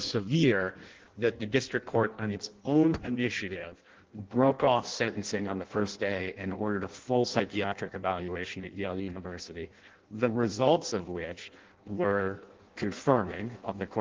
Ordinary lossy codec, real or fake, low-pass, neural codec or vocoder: Opus, 16 kbps; fake; 7.2 kHz; codec, 16 kHz in and 24 kHz out, 0.6 kbps, FireRedTTS-2 codec